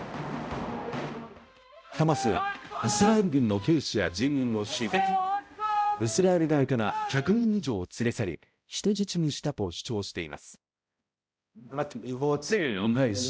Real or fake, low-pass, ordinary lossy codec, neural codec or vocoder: fake; none; none; codec, 16 kHz, 0.5 kbps, X-Codec, HuBERT features, trained on balanced general audio